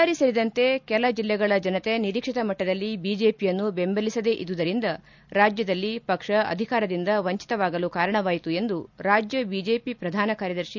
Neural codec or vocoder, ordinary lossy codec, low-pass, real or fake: none; none; 7.2 kHz; real